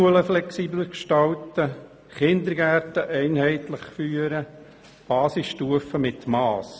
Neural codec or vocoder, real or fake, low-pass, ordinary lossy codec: none; real; none; none